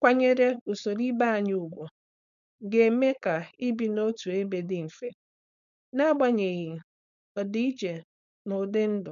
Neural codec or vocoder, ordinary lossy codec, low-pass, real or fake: codec, 16 kHz, 4.8 kbps, FACodec; none; 7.2 kHz; fake